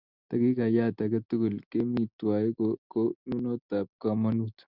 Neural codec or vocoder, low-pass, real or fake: none; 5.4 kHz; real